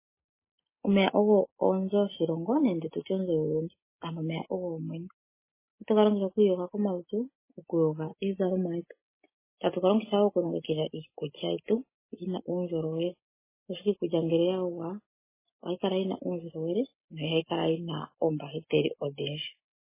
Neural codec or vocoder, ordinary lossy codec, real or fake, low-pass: none; MP3, 16 kbps; real; 3.6 kHz